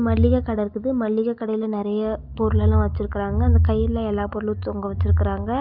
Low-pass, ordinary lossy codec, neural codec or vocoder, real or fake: 5.4 kHz; none; none; real